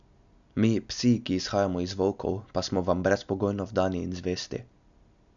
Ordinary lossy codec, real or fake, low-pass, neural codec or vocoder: none; real; 7.2 kHz; none